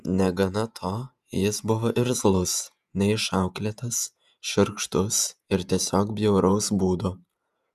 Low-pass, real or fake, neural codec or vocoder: 14.4 kHz; fake; vocoder, 48 kHz, 128 mel bands, Vocos